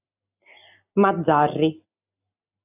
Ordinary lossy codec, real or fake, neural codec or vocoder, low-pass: AAC, 32 kbps; real; none; 3.6 kHz